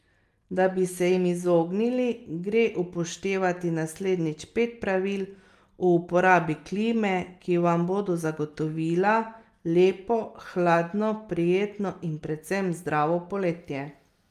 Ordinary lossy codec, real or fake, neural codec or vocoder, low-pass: Opus, 32 kbps; real; none; 14.4 kHz